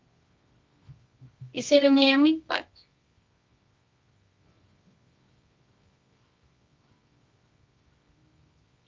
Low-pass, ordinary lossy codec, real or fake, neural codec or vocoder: 7.2 kHz; Opus, 24 kbps; fake; codec, 24 kHz, 0.9 kbps, WavTokenizer, medium music audio release